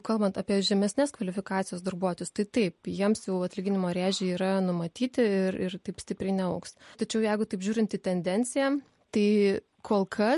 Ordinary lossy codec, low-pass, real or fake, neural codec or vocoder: MP3, 48 kbps; 14.4 kHz; real; none